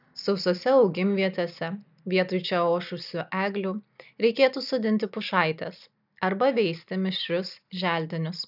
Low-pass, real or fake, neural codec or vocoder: 5.4 kHz; real; none